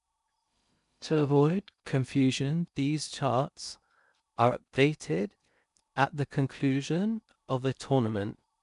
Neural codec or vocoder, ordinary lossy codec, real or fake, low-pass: codec, 16 kHz in and 24 kHz out, 0.6 kbps, FocalCodec, streaming, 2048 codes; none; fake; 10.8 kHz